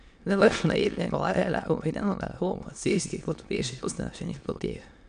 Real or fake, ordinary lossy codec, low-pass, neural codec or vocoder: fake; none; 9.9 kHz; autoencoder, 22.05 kHz, a latent of 192 numbers a frame, VITS, trained on many speakers